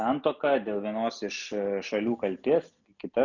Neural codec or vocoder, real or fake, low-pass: none; real; 7.2 kHz